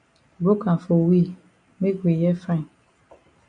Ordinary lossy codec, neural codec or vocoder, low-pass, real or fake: MP3, 64 kbps; none; 9.9 kHz; real